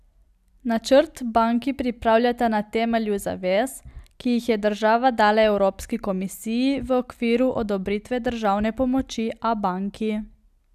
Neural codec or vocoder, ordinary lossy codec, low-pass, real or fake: none; none; 14.4 kHz; real